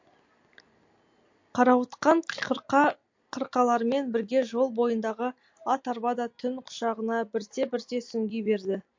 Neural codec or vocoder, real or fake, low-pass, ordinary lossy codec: none; real; 7.2 kHz; MP3, 48 kbps